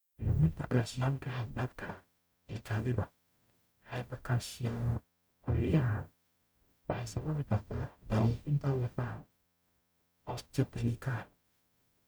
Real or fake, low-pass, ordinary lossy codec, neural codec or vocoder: fake; none; none; codec, 44.1 kHz, 0.9 kbps, DAC